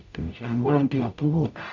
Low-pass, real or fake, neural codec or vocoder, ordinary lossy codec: 7.2 kHz; fake; codec, 44.1 kHz, 0.9 kbps, DAC; none